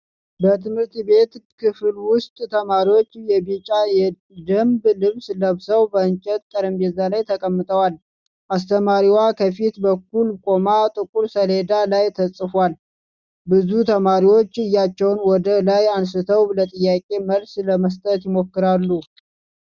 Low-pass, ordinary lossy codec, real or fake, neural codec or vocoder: 7.2 kHz; Opus, 64 kbps; real; none